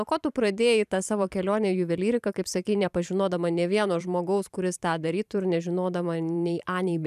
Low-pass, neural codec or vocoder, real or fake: 14.4 kHz; vocoder, 44.1 kHz, 128 mel bands every 512 samples, BigVGAN v2; fake